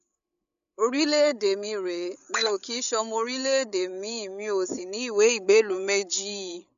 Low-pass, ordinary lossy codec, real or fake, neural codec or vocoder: 7.2 kHz; none; fake; codec, 16 kHz, 8 kbps, FreqCodec, larger model